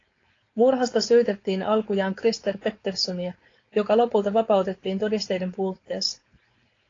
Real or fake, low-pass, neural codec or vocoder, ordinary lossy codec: fake; 7.2 kHz; codec, 16 kHz, 4.8 kbps, FACodec; AAC, 32 kbps